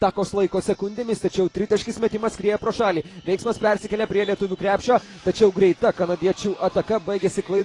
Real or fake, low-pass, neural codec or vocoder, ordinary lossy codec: fake; 10.8 kHz; vocoder, 44.1 kHz, 128 mel bands every 512 samples, BigVGAN v2; AAC, 32 kbps